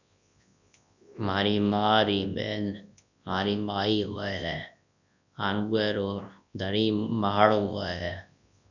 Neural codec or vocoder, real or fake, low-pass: codec, 24 kHz, 0.9 kbps, WavTokenizer, large speech release; fake; 7.2 kHz